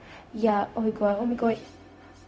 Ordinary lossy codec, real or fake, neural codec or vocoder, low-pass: none; fake; codec, 16 kHz, 0.4 kbps, LongCat-Audio-Codec; none